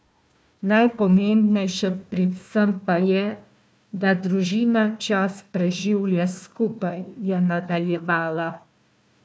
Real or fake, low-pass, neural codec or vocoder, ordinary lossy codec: fake; none; codec, 16 kHz, 1 kbps, FunCodec, trained on Chinese and English, 50 frames a second; none